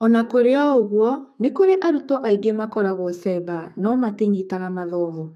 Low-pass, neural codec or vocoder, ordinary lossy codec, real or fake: 14.4 kHz; codec, 32 kHz, 1.9 kbps, SNAC; none; fake